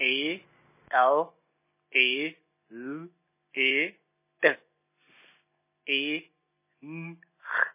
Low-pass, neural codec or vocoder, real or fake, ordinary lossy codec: 3.6 kHz; none; real; MP3, 16 kbps